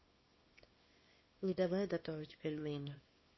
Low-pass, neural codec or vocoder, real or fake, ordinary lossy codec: 7.2 kHz; codec, 24 kHz, 0.9 kbps, WavTokenizer, small release; fake; MP3, 24 kbps